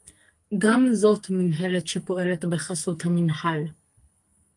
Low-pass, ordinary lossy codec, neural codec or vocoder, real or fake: 10.8 kHz; Opus, 32 kbps; codec, 44.1 kHz, 2.6 kbps, SNAC; fake